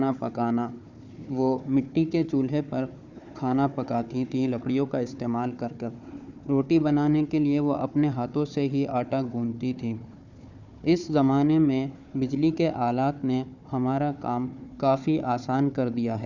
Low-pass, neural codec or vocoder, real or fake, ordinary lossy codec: 7.2 kHz; codec, 16 kHz, 4 kbps, FunCodec, trained on Chinese and English, 50 frames a second; fake; none